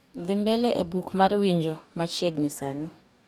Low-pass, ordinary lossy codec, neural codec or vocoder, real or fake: 19.8 kHz; none; codec, 44.1 kHz, 2.6 kbps, DAC; fake